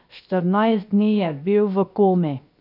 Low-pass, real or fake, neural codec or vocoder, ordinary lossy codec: 5.4 kHz; fake; codec, 16 kHz, 0.7 kbps, FocalCodec; none